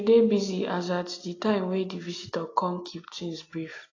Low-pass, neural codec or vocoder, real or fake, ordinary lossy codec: 7.2 kHz; none; real; AAC, 32 kbps